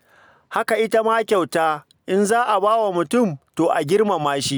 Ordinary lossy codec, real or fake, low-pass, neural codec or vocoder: none; real; none; none